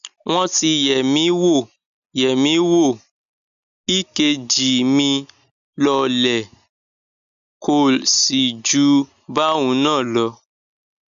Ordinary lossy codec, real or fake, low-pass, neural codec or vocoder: none; real; 7.2 kHz; none